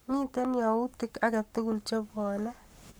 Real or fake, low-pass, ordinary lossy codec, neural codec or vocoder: fake; none; none; codec, 44.1 kHz, 7.8 kbps, Pupu-Codec